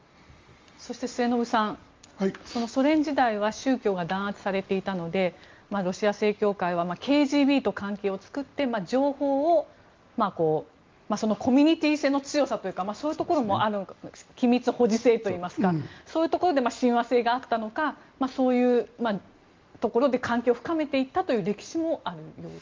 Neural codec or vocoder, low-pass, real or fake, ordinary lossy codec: none; 7.2 kHz; real; Opus, 32 kbps